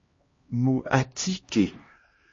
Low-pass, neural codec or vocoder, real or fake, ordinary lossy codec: 7.2 kHz; codec, 16 kHz, 1 kbps, X-Codec, HuBERT features, trained on LibriSpeech; fake; MP3, 32 kbps